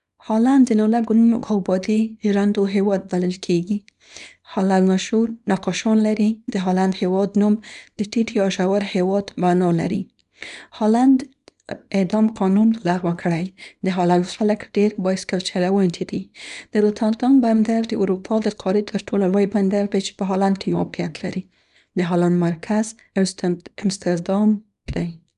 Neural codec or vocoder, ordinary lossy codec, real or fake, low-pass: codec, 24 kHz, 0.9 kbps, WavTokenizer, small release; none; fake; 10.8 kHz